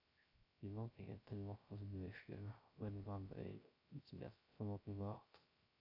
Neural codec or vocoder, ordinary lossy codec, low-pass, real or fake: codec, 16 kHz, 0.3 kbps, FocalCodec; AAC, 32 kbps; 5.4 kHz; fake